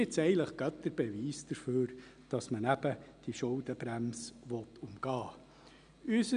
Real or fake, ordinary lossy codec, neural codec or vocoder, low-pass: real; none; none; 9.9 kHz